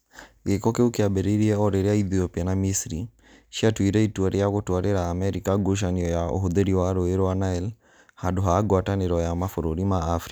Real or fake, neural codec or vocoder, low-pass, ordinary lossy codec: real; none; none; none